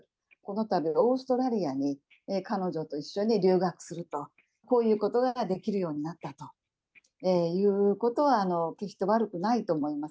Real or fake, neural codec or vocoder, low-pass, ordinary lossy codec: real; none; none; none